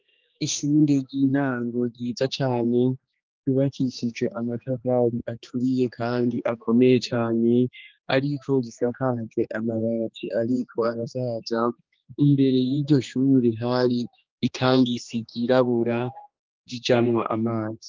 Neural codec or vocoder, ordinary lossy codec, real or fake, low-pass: codec, 16 kHz, 2 kbps, X-Codec, HuBERT features, trained on general audio; Opus, 24 kbps; fake; 7.2 kHz